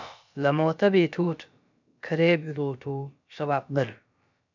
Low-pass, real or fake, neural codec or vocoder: 7.2 kHz; fake; codec, 16 kHz, about 1 kbps, DyCAST, with the encoder's durations